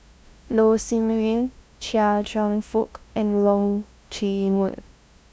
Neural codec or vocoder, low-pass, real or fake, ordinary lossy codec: codec, 16 kHz, 0.5 kbps, FunCodec, trained on LibriTTS, 25 frames a second; none; fake; none